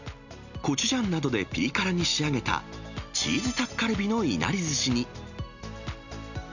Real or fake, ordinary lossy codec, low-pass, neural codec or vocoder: real; none; 7.2 kHz; none